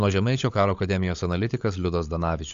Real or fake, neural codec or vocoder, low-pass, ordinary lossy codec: fake; codec, 16 kHz, 16 kbps, FunCodec, trained on LibriTTS, 50 frames a second; 7.2 kHz; AAC, 64 kbps